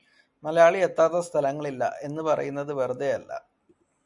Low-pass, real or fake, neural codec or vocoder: 10.8 kHz; real; none